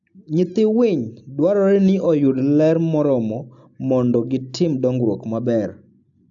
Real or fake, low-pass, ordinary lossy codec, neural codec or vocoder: real; 7.2 kHz; AAC, 64 kbps; none